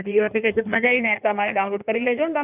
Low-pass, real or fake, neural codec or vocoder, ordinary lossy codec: 3.6 kHz; fake; codec, 16 kHz in and 24 kHz out, 1.1 kbps, FireRedTTS-2 codec; none